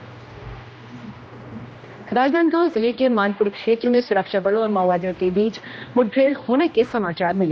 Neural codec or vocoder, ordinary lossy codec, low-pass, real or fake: codec, 16 kHz, 1 kbps, X-Codec, HuBERT features, trained on general audio; none; none; fake